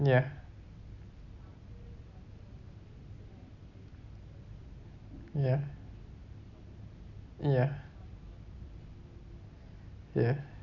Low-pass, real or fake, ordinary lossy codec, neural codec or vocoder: 7.2 kHz; real; none; none